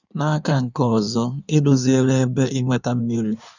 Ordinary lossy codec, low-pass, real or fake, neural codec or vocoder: none; 7.2 kHz; fake; codec, 16 kHz in and 24 kHz out, 1.1 kbps, FireRedTTS-2 codec